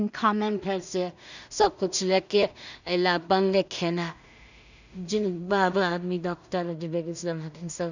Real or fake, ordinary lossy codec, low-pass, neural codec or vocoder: fake; none; 7.2 kHz; codec, 16 kHz in and 24 kHz out, 0.4 kbps, LongCat-Audio-Codec, two codebook decoder